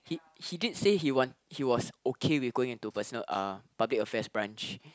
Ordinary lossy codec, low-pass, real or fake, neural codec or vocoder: none; none; real; none